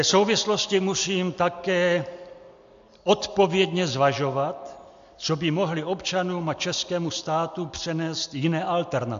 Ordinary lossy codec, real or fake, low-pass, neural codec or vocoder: AAC, 64 kbps; real; 7.2 kHz; none